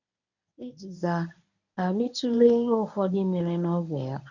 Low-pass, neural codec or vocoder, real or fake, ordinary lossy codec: 7.2 kHz; codec, 24 kHz, 0.9 kbps, WavTokenizer, medium speech release version 1; fake; none